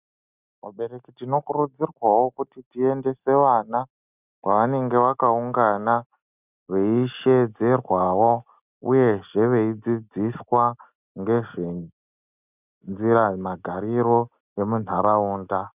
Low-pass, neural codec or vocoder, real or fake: 3.6 kHz; none; real